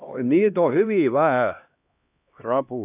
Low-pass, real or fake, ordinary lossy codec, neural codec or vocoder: 3.6 kHz; fake; none; codec, 16 kHz, 1 kbps, X-Codec, HuBERT features, trained on LibriSpeech